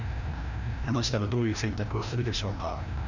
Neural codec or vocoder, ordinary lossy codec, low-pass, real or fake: codec, 16 kHz, 1 kbps, FreqCodec, larger model; none; 7.2 kHz; fake